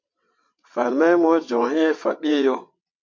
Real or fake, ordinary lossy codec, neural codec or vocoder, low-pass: fake; MP3, 48 kbps; vocoder, 22.05 kHz, 80 mel bands, WaveNeXt; 7.2 kHz